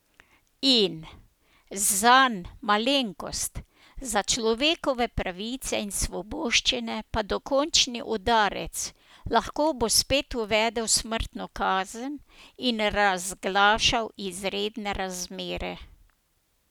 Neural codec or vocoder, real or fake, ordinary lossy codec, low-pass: none; real; none; none